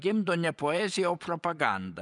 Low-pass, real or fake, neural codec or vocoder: 10.8 kHz; fake; vocoder, 24 kHz, 100 mel bands, Vocos